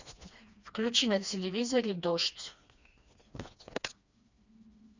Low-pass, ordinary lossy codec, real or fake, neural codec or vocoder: 7.2 kHz; Opus, 64 kbps; fake; codec, 16 kHz, 2 kbps, FreqCodec, smaller model